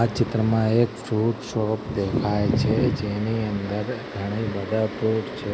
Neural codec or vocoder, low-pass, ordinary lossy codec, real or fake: none; none; none; real